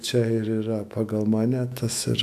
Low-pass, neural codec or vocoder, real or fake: 14.4 kHz; autoencoder, 48 kHz, 128 numbers a frame, DAC-VAE, trained on Japanese speech; fake